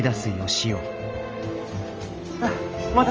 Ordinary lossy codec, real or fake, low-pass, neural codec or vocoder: Opus, 24 kbps; real; 7.2 kHz; none